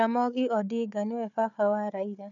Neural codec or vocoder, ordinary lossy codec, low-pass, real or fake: codec, 16 kHz, 4 kbps, FunCodec, trained on Chinese and English, 50 frames a second; none; 7.2 kHz; fake